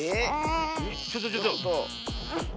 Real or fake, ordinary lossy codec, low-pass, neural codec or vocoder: real; none; none; none